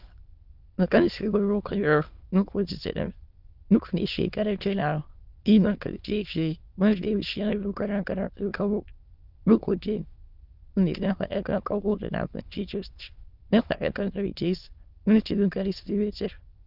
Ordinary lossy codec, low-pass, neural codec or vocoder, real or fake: Opus, 24 kbps; 5.4 kHz; autoencoder, 22.05 kHz, a latent of 192 numbers a frame, VITS, trained on many speakers; fake